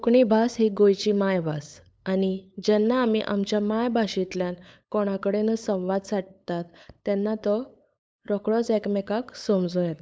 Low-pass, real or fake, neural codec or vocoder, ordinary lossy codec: none; fake; codec, 16 kHz, 8 kbps, FunCodec, trained on LibriTTS, 25 frames a second; none